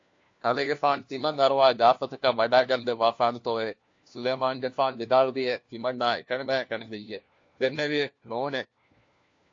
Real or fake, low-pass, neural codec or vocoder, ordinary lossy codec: fake; 7.2 kHz; codec, 16 kHz, 1 kbps, FunCodec, trained on LibriTTS, 50 frames a second; AAC, 48 kbps